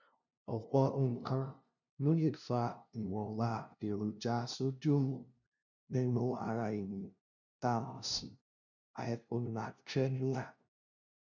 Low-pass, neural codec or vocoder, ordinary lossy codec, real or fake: 7.2 kHz; codec, 16 kHz, 0.5 kbps, FunCodec, trained on LibriTTS, 25 frames a second; none; fake